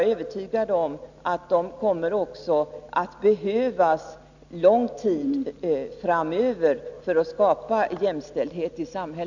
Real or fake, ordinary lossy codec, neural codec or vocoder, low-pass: real; none; none; 7.2 kHz